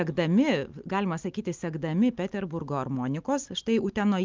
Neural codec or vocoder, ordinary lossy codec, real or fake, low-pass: none; Opus, 32 kbps; real; 7.2 kHz